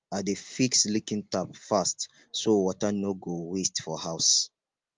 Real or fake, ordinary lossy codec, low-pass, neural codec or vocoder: real; Opus, 32 kbps; 7.2 kHz; none